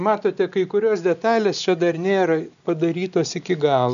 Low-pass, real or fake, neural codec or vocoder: 7.2 kHz; real; none